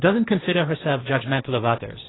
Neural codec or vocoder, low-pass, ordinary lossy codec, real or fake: codec, 16 kHz, 1.1 kbps, Voila-Tokenizer; 7.2 kHz; AAC, 16 kbps; fake